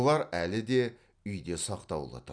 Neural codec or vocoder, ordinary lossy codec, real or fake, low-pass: none; none; real; 9.9 kHz